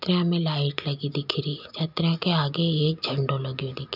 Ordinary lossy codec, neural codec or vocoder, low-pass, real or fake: none; none; 5.4 kHz; real